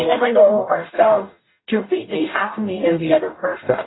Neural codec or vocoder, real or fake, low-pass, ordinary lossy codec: codec, 44.1 kHz, 0.9 kbps, DAC; fake; 7.2 kHz; AAC, 16 kbps